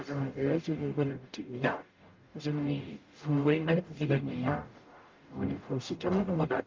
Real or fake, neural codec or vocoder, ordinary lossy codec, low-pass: fake; codec, 44.1 kHz, 0.9 kbps, DAC; Opus, 24 kbps; 7.2 kHz